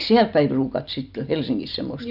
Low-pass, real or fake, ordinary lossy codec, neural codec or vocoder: 5.4 kHz; real; none; none